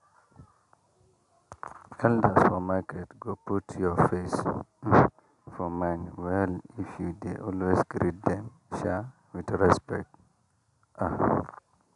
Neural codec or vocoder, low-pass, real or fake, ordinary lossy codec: none; 10.8 kHz; real; none